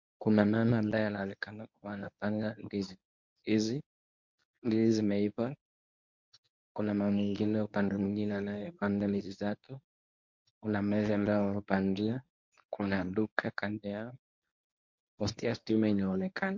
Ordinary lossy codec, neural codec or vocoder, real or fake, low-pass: MP3, 48 kbps; codec, 24 kHz, 0.9 kbps, WavTokenizer, medium speech release version 1; fake; 7.2 kHz